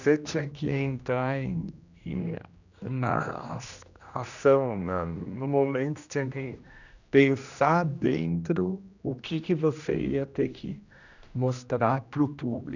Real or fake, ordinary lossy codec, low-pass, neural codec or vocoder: fake; none; 7.2 kHz; codec, 16 kHz, 1 kbps, X-Codec, HuBERT features, trained on general audio